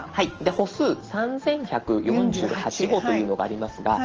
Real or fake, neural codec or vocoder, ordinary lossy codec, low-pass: real; none; Opus, 16 kbps; 7.2 kHz